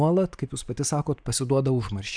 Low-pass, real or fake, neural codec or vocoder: 9.9 kHz; fake; vocoder, 44.1 kHz, 128 mel bands every 512 samples, BigVGAN v2